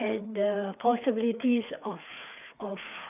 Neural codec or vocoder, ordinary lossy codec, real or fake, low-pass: codec, 16 kHz, 4 kbps, FreqCodec, larger model; none; fake; 3.6 kHz